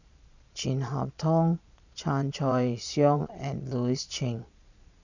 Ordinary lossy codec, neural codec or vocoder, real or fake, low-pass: none; vocoder, 22.05 kHz, 80 mel bands, Vocos; fake; 7.2 kHz